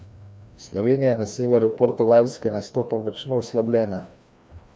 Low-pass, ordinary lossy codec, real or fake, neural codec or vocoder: none; none; fake; codec, 16 kHz, 1 kbps, FreqCodec, larger model